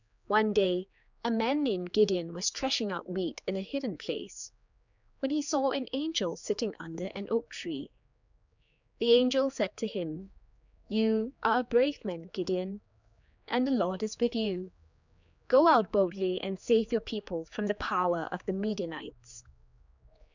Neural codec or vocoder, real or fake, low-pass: codec, 16 kHz, 4 kbps, X-Codec, HuBERT features, trained on general audio; fake; 7.2 kHz